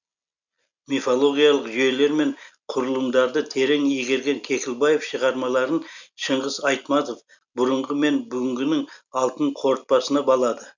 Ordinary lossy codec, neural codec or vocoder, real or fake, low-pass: none; none; real; 7.2 kHz